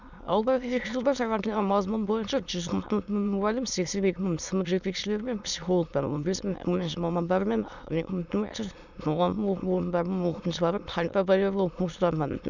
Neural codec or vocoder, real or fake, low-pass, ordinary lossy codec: autoencoder, 22.05 kHz, a latent of 192 numbers a frame, VITS, trained on many speakers; fake; 7.2 kHz; none